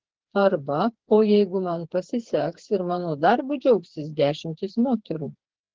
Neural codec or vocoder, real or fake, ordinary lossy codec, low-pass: codec, 16 kHz, 4 kbps, FreqCodec, smaller model; fake; Opus, 16 kbps; 7.2 kHz